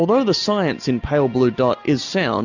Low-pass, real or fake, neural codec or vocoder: 7.2 kHz; real; none